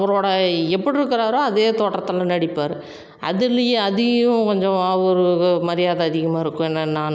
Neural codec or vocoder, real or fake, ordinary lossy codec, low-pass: none; real; none; none